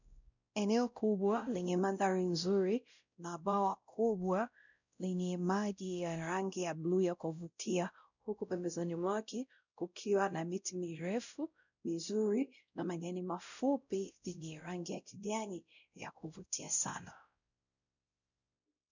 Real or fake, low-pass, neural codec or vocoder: fake; 7.2 kHz; codec, 16 kHz, 0.5 kbps, X-Codec, WavLM features, trained on Multilingual LibriSpeech